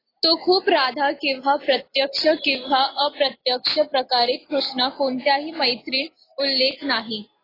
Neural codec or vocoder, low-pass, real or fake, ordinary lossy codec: none; 5.4 kHz; real; AAC, 24 kbps